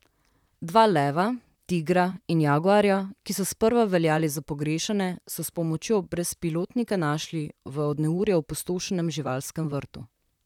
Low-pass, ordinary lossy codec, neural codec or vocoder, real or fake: 19.8 kHz; none; vocoder, 44.1 kHz, 128 mel bands, Pupu-Vocoder; fake